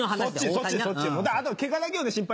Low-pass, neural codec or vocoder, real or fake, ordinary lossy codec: none; none; real; none